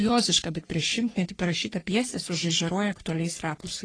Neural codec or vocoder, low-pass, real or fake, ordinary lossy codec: codec, 44.1 kHz, 3.4 kbps, Pupu-Codec; 9.9 kHz; fake; AAC, 32 kbps